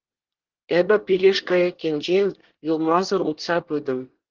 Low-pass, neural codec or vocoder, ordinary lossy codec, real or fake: 7.2 kHz; codec, 24 kHz, 1 kbps, SNAC; Opus, 16 kbps; fake